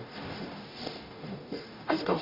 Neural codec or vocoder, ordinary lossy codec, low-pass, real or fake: codec, 44.1 kHz, 0.9 kbps, DAC; none; 5.4 kHz; fake